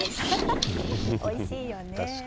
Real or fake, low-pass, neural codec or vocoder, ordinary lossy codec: real; none; none; none